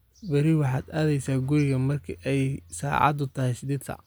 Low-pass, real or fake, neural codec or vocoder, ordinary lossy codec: none; real; none; none